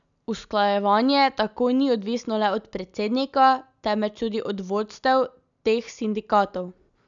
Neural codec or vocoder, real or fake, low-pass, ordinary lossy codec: none; real; 7.2 kHz; none